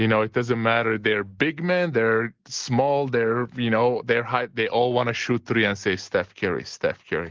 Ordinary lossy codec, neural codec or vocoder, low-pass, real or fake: Opus, 16 kbps; none; 7.2 kHz; real